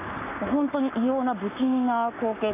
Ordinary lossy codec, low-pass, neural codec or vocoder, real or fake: none; 3.6 kHz; codec, 44.1 kHz, 7.8 kbps, Pupu-Codec; fake